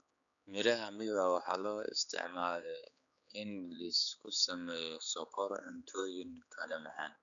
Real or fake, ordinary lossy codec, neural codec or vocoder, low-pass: fake; none; codec, 16 kHz, 4 kbps, X-Codec, HuBERT features, trained on general audio; 7.2 kHz